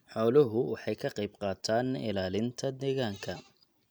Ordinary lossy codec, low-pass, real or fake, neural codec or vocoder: none; none; real; none